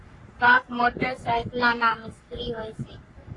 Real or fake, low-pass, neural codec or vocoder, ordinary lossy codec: fake; 10.8 kHz; codec, 44.1 kHz, 3.4 kbps, Pupu-Codec; AAC, 32 kbps